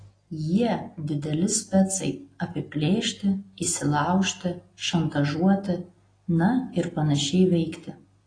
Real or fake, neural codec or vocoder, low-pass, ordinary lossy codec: real; none; 9.9 kHz; AAC, 32 kbps